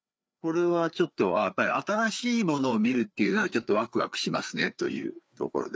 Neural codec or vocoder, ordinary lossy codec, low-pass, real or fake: codec, 16 kHz, 4 kbps, FreqCodec, larger model; none; none; fake